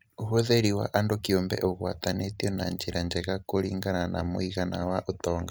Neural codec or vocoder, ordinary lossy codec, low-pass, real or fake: none; none; none; real